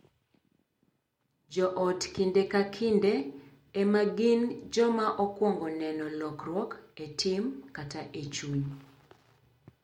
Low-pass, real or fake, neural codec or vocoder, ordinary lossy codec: 19.8 kHz; real; none; MP3, 64 kbps